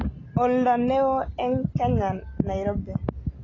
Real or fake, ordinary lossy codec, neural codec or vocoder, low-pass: real; AAC, 32 kbps; none; 7.2 kHz